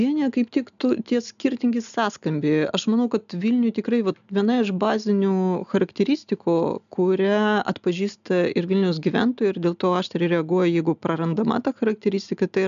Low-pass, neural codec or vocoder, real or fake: 7.2 kHz; none; real